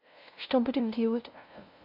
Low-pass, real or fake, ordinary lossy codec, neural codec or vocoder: 5.4 kHz; fake; AAC, 32 kbps; codec, 16 kHz, 0.5 kbps, FunCodec, trained on LibriTTS, 25 frames a second